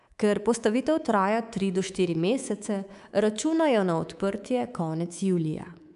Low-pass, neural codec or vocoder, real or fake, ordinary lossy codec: 10.8 kHz; codec, 24 kHz, 3.1 kbps, DualCodec; fake; none